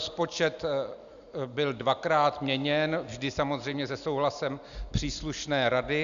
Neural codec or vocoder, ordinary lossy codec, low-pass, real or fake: none; MP3, 96 kbps; 7.2 kHz; real